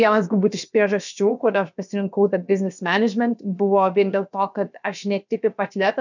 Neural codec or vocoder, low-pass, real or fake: codec, 16 kHz, about 1 kbps, DyCAST, with the encoder's durations; 7.2 kHz; fake